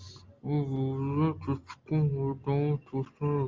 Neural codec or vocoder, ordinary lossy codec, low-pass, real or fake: none; Opus, 32 kbps; 7.2 kHz; real